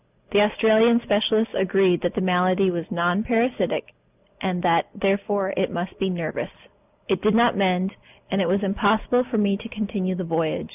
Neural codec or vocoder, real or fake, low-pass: none; real; 3.6 kHz